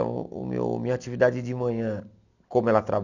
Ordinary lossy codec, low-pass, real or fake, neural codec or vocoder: none; 7.2 kHz; real; none